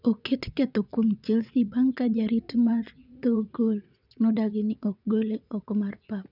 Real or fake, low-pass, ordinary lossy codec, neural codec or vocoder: fake; 5.4 kHz; none; vocoder, 22.05 kHz, 80 mel bands, WaveNeXt